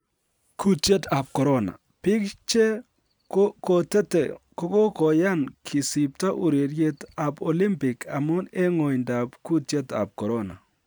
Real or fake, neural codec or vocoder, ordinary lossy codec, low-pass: real; none; none; none